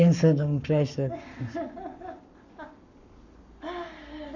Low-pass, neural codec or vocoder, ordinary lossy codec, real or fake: 7.2 kHz; codec, 44.1 kHz, 7.8 kbps, Pupu-Codec; none; fake